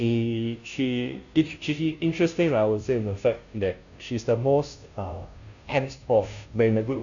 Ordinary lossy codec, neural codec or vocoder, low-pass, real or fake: none; codec, 16 kHz, 0.5 kbps, FunCodec, trained on Chinese and English, 25 frames a second; 7.2 kHz; fake